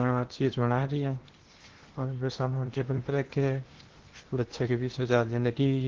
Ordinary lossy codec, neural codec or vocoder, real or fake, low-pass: Opus, 16 kbps; codec, 16 kHz in and 24 kHz out, 0.8 kbps, FocalCodec, streaming, 65536 codes; fake; 7.2 kHz